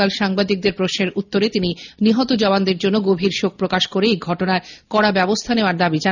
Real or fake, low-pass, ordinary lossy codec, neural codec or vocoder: real; 7.2 kHz; none; none